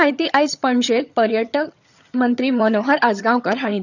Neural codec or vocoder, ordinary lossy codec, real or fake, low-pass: vocoder, 22.05 kHz, 80 mel bands, HiFi-GAN; none; fake; 7.2 kHz